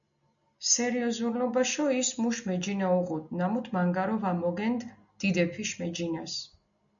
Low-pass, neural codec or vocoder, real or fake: 7.2 kHz; none; real